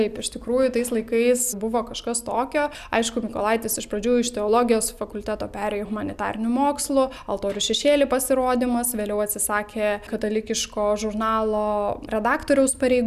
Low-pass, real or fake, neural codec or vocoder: 14.4 kHz; real; none